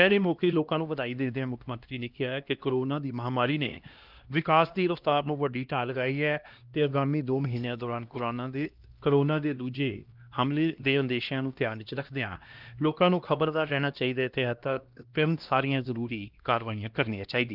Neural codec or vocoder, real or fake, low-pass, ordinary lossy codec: codec, 16 kHz, 1 kbps, X-Codec, HuBERT features, trained on LibriSpeech; fake; 5.4 kHz; Opus, 32 kbps